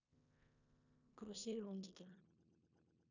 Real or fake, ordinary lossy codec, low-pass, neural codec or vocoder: fake; none; 7.2 kHz; codec, 16 kHz in and 24 kHz out, 0.9 kbps, LongCat-Audio-Codec, four codebook decoder